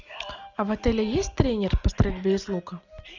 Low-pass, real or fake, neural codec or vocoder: 7.2 kHz; real; none